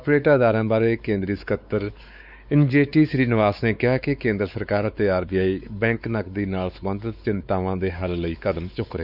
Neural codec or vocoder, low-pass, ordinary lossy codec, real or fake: codec, 16 kHz, 4 kbps, X-Codec, WavLM features, trained on Multilingual LibriSpeech; 5.4 kHz; none; fake